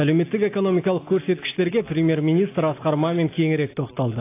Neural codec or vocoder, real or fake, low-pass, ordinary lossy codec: none; real; 3.6 kHz; AAC, 24 kbps